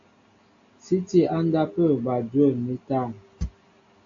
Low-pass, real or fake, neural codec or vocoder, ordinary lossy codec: 7.2 kHz; real; none; AAC, 48 kbps